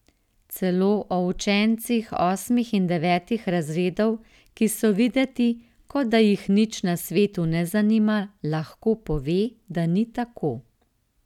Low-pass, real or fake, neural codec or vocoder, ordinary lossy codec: 19.8 kHz; real; none; none